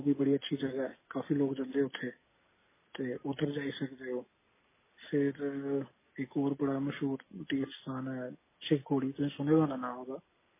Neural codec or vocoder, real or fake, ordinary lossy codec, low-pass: none; real; MP3, 16 kbps; 3.6 kHz